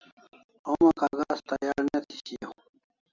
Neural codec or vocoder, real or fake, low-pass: none; real; 7.2 kHz